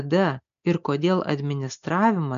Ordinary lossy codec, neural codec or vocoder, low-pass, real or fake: AAC, 96 kbps; none; 7.2 kHz; real